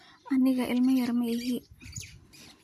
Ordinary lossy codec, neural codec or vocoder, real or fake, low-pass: MP3, 64 kbps; none; real; 14.4 kHz